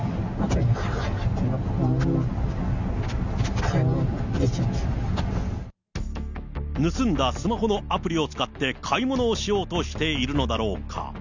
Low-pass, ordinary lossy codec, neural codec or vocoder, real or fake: 7.2 kHz; none; none; real